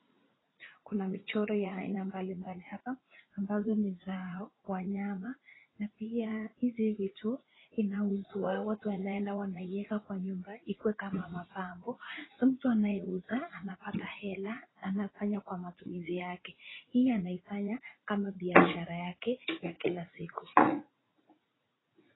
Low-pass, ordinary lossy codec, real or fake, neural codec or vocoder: 7.2 kHz; AAC, 16 kbps; fake; vocoder, 44.1 kHz, 80 mel bands, Vocos